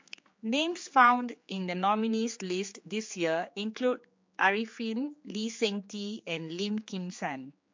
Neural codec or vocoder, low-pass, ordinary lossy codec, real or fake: codec, 16 kHz, 4 kbps, X-Codec, HuBERT features, trained on general audio; 7.2 kHz; MP3, 48 kbps; fake